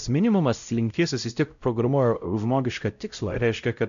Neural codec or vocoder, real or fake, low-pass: codec, 16 kHz, 0.5 kbps, X-Codec, WavLM features, trained on Multilingual LibriSpeech; fake; 7.2 kHz